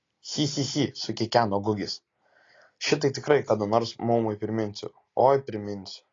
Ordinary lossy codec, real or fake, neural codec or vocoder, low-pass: AAC, 32 kbps; real; none; 7.2 kHz